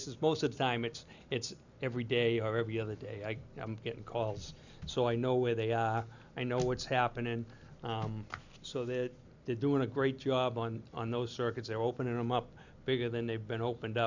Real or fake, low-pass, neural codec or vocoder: real; 7.2 kHz; none